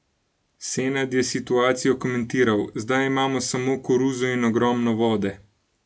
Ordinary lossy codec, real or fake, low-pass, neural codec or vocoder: none; real; none; none